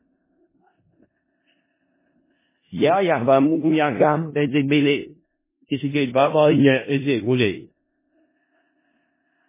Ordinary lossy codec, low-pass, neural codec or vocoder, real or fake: MP3, 16 kbps; 3.6 kHz; codec, 16 kHz in and 24 kHz out, 0.4 kbps, LongCat-Audio-Codec, four codebook decoder; fake